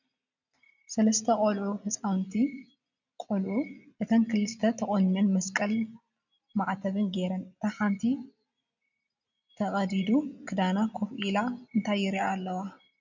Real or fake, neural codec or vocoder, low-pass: real; none; 7.2 kHz